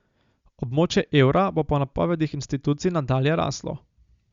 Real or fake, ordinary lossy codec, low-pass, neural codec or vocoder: real; Opus, 64 kbps; 7.2 kHz; none